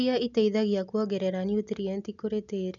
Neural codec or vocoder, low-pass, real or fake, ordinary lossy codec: none; 7.2 kHz; real; none